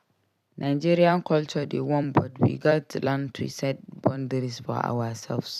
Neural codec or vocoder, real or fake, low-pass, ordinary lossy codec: vocoder, 44.1 kHz, 128 mel bands every 256 samples, BigVGAN v2; fake; 14.4 kHz; none